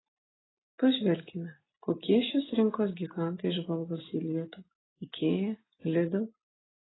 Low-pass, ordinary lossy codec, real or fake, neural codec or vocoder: 7.2 kHz; AAC, 16 kbps; real; none